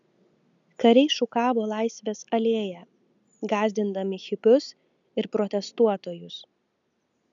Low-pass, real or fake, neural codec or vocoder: 7.2 kHz; real; none